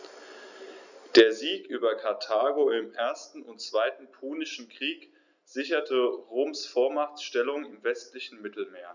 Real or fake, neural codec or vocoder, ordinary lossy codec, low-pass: real; none; none; 7.2 kHz